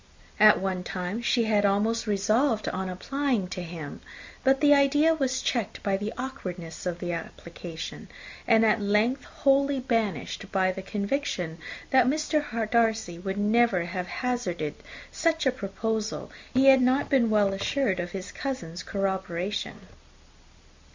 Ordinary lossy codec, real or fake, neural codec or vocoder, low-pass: MP3, 48 kbps; real; none; 7.2 kHz